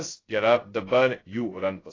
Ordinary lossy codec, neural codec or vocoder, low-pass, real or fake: AAC, 32 kbps; codec, 16 kHz, 0.2 kbps, FocalCodec; 7.2 kHz; fake